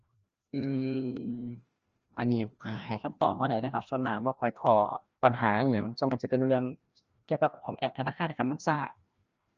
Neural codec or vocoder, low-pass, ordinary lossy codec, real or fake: codec, 16 kHz, 1 kbps, FreqCodec, larger model; 7.2 kHz; Opus, 24 kbps; fake